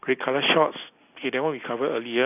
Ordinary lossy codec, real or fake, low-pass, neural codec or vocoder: none; real; 3.6 kHz; none